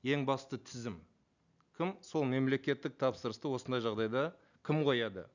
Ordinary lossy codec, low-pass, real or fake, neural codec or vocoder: none; 7.2 kHz; real; none